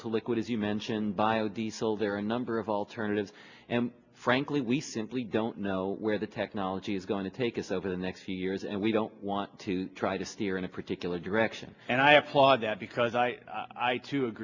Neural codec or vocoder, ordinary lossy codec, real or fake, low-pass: none; Opus, 64 kbps; real; 7.2 kHz